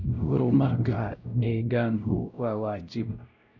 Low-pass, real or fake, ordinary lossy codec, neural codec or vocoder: 7.2 kHz; fake; none; codec, 16 kHz, 0.5 kbps, X-Codec, WavLM features, trained on Multilingual LibriSpeech